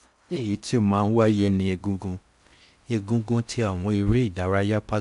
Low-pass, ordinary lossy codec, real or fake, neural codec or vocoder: 10.8 kHz; none; fake; codec, 16 kHz in and 24 kHz out, 0.6 kbps, FocalCodec, streaming, 4096 codes